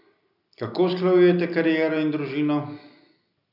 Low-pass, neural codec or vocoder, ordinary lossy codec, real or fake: 5.4 kHz; none; none; real